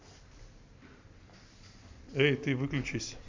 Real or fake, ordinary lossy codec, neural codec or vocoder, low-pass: real; MP3, 64 kbps; none; 7.2 kHz